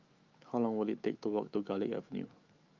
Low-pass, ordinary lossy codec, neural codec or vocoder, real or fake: 7.2 kHz; Opus, 24 kbps; autoencoder, 48 kHz, 128 numbers a frame, DAC-VAE, trained on Japanese speech; fake